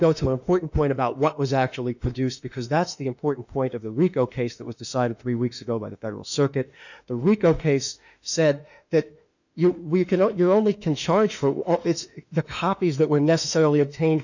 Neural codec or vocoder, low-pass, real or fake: autoencoder, 48 kHz, 32 numbers a frame, DAC-VAE, trained on Japanese speech; 7.2 kHz; fake